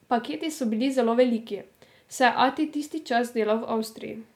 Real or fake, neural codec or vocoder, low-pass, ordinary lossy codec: real; none; 19.8 kHz; MP3, 96 kbps